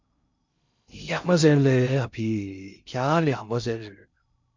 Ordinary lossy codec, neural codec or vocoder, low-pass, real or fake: MP3, 64 kbps; codec, 16 kHz in and 24 kHz out, 0.6 kbps, FocalCodec, streaming, 2048 codes; 7.2 kHz; fake